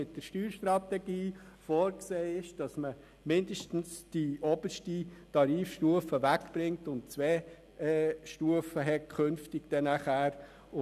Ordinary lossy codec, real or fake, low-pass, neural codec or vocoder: none; real; 14.4 kHz; none